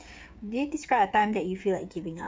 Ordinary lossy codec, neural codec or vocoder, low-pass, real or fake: none; codec, 16 kHz, 8 kbps, FreqCodec, smaller model; none; fake